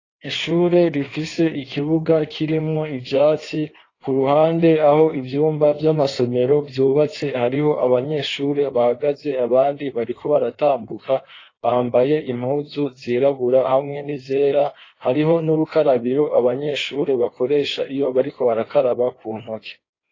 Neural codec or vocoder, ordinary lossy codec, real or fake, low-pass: codec, 16 kHz in and 24 kHz out, 1.1 kbps, FireRedTTS-2 codec; AAC, 32 kbps; fake; 7.2 kHz